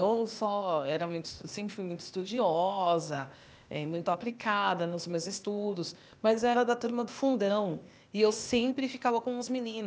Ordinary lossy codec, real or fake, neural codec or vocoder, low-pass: none; fake; codec, 16 kHz, 0.8 kbps, ZipCodec; none